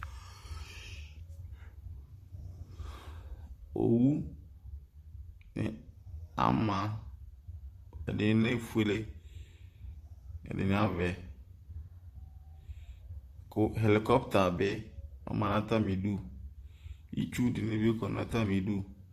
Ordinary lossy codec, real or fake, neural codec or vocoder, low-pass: Opus, 64 kbps; fake; vocoder, 44.1 kHz, 128 mel bands, Pupu-Vocoder; 14.4 kHz